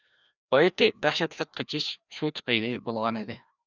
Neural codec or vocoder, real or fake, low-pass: codec, 16 kHz, 1 kbps, FreqCodec, larger model; fake; 7.2 kHz